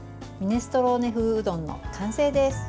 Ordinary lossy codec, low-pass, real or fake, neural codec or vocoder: none; none; real; none